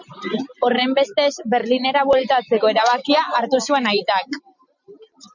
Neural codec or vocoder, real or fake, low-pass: none; real; 7.2 kHz